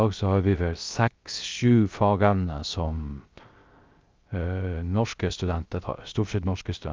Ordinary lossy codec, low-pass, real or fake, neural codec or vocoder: Opus, 32 kbps; 7.2 kHz; fake; codec, 16 kHz, 0.3 kbps, FocalCodec